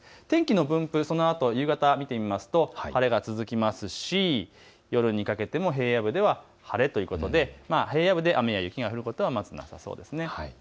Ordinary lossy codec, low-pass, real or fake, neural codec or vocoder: none; none; real; none